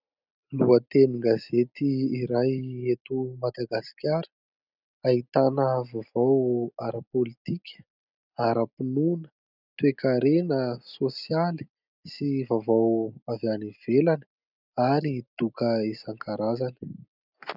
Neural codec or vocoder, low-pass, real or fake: none; 5.4 kHz; real